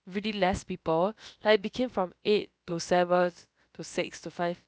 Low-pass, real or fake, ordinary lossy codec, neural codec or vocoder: none; fake; none; codec, 16 kHz, about 1 kbps, DyCAST, with the encoder's durations